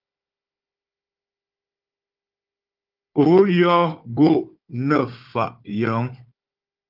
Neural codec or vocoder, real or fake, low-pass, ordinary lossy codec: codec, 16 kHz, 4 kbps, FunCodec, trained on Chinese and English, 50 frames a second; fake; 5.4 kHz; Opus, 24 kbps